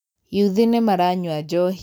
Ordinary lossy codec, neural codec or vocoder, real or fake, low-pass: none; none; real; none